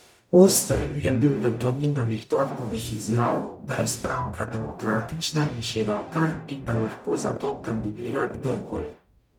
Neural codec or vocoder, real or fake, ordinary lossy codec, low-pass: codec, 44.1 kHz, 0.9 kbps, DAC; fake; none; 19.8 kHz